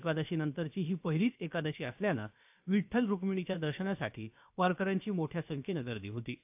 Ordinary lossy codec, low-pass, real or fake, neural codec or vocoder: AAC, 32 kbps; 3.6 kHz; fake; codec, 16 kHz, about 1 kbps, DyCAST, with the encoder's durations